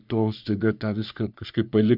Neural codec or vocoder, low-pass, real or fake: codec, 44.1 kHz, 3.4 kbps, Pupu-Codec; 5.4 kHz; fake